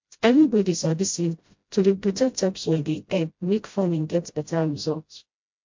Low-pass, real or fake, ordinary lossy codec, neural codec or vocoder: 7.2 kHz; fake; MP3, 48 kbps; codec, 16 kHz, 0.5 kbps, FreqCodec, smaller model